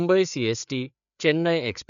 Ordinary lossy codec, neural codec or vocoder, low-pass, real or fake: none; codec, 16 kHz, 4 kbps, FreqCodec, larger model; 7.2 kHz; fake